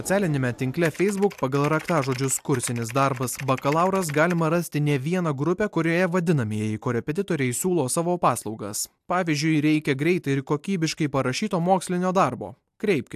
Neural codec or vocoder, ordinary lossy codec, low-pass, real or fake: none; AAC, 96 kbps; 14.4 kHz; real